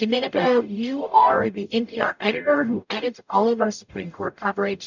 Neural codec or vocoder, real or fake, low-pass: codec, 44.1 kHz, 0.9 kbps, DAC; fake; 7.2 kHz